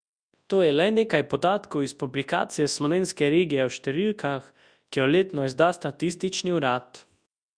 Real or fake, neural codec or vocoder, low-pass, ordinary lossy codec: fake; codec, 24 kHz, 0.9 kbps, WavTokenizer, large speech release; 9.9 kHz; Opus, 64 kbps